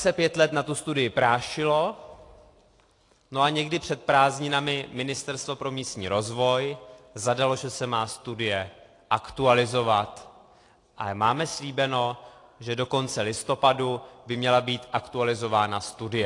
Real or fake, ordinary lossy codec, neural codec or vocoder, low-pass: real; AAC, 48 kbps; none; 10.8 kHz